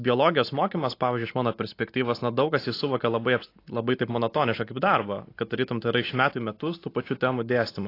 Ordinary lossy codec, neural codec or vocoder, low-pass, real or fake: AAC, 32 kbps; none; 5.4 kHz; real